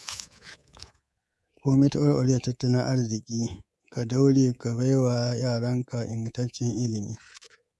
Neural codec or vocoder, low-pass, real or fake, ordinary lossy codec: codec, 24 kHz, 3.1 kbps, DualCodec; none; fake; none